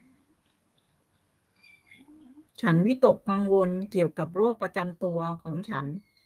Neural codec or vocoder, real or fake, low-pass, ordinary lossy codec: codec, 32 kHz, 1.9 kbps, SNAC; fake; 14.4 kHz; Opus, 32 kbps